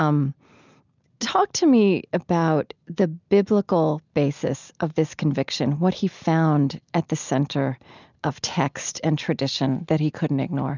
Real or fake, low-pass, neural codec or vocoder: real; 7.2 kHz; none